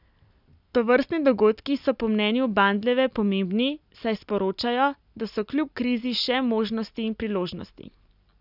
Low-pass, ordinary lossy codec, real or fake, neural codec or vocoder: 5.4 kHz; none; real; none